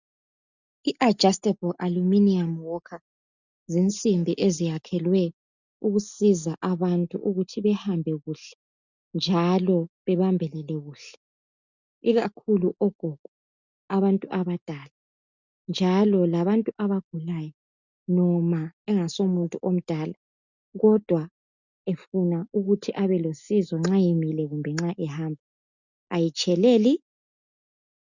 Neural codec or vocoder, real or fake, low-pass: none; real; 7.2 kHz